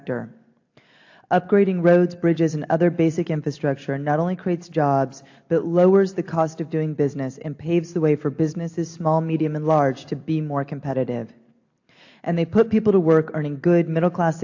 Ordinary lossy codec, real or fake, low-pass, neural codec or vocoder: AAC, 48 kbps; real; 7.2 kHz; none